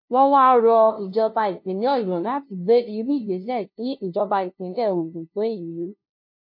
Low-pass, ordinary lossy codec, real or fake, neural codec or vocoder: 5.4 kHz; MP3, 32 kbps; fake; codec, 16 kHz, 0.5 kbps, FunCodec, trained on LibriTTS, 25 frames a second